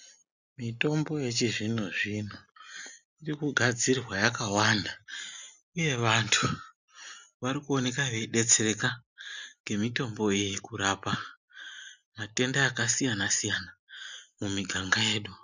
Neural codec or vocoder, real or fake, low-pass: none; real; 7.2 kHz